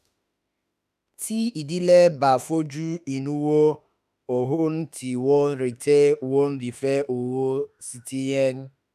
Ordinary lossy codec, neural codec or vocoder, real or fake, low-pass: none; autoencoder, 48 kHz, 32 numbers a frame, DAC-VAE, trained on Japanese speech; fake; 14.4 kHz